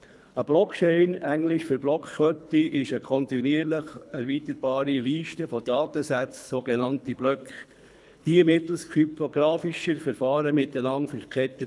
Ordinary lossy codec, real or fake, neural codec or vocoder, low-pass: none; fake; codec, 24 kHz, 3 kbps, HILCodec; none